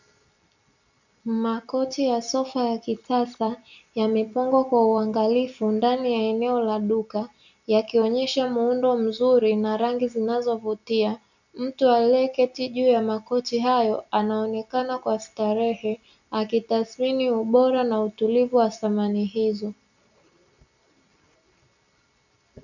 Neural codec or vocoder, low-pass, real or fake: none; 7.2 kHz; real